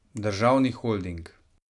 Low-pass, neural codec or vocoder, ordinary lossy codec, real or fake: 10.8 kHz; none; none; real